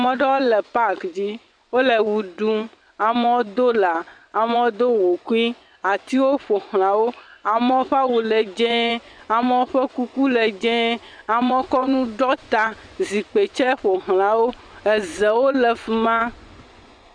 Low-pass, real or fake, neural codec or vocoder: 9.9 kHz; fake; vocoder, 24 kHz, 100 mel bands, Vocos